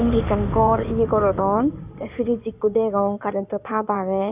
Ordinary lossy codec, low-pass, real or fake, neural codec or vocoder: none; 3.6 kHz; fake; codec, 16 kHz in and 24 kHz out, 2.2 kbps, FireRedTTS-2 codec